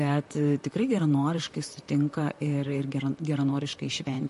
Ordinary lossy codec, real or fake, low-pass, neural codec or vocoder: MP3, 48 kbps; fake; 14.4 kHz; vocoder, 44.1 kHz, 128 mel bands, Pupu-Vocoder